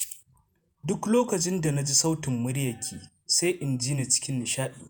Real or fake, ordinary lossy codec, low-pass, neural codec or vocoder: fake; none; none; vocoder, 48 kHz, 128 mel bands, Vocos